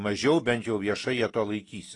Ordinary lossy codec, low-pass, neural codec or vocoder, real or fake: AAC, 32 kbps; 10.8 kHz; none; real